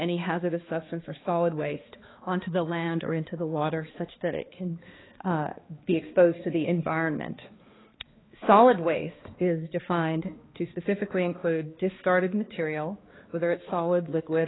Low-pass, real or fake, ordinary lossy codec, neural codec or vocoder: 7.2 kHz; fake; AAC, 16 kbps; codec, 16 kHz, 2 kbps, X-Codec, HuBERT features, trained on balanced general audio